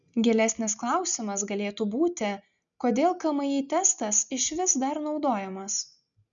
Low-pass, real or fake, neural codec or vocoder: 7.2 kHz; real; none